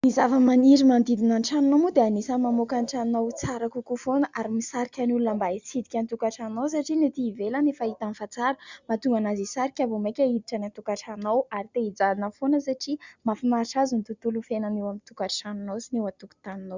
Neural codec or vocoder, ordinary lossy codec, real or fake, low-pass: none; Opus, 64 kbps; real; 7.2 kHz